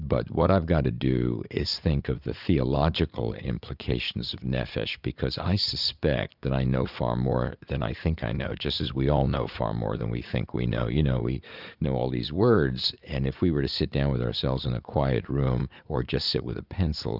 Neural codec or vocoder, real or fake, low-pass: vocoder, 44.1 kHz, 80 mel bands, Vocos; fake; 5.4 kHz